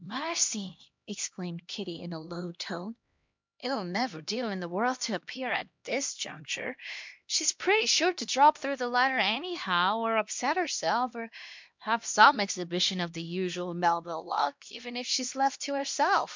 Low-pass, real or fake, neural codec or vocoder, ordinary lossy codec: 7.2 kHz; fake; codec, 16 kHz, 1 kbps, X-Codec, HuBERT features, trained on LibriSpeech; MP3, 64 kbps